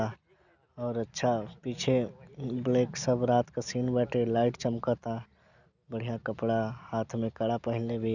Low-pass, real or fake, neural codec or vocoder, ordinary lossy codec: 7.2 kHz; real; none; none